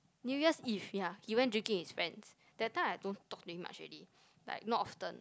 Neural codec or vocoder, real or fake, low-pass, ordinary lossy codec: none; real; none; none